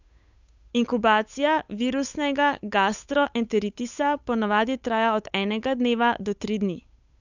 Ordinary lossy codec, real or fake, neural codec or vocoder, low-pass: none; fake; codec, 16 kHz, 8 kbps, FunCodec, trained on Chinese and English, 25 frames a second; 7.2 kHz